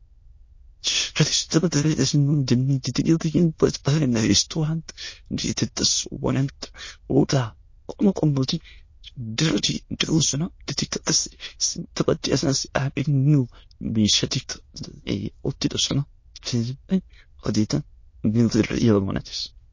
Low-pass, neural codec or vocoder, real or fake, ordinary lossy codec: 7.2 kHz; autoencoder, 22.05 kHz, a latent of 192 numbers a frame, VITS, trained on many speakers; fake; MP3, 32 kbps